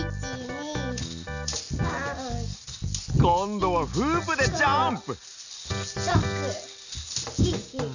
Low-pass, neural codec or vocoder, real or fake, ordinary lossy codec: 7.2 kHz; none; real; none